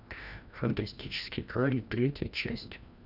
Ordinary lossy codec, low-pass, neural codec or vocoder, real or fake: none; 5.4 kHz; codec, 16 kHz, 1 kbps, FreqCodec, larger model; fake